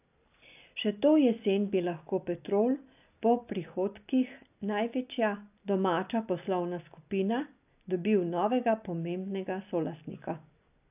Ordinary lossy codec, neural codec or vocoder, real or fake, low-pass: AAC, 32 kbps; none; real; 3.6 kHz